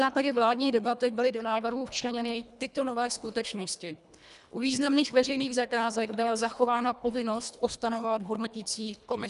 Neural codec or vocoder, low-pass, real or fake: codec, 24 kHz, 1.5 kbps, HILCodec; 10.8 kHz; fake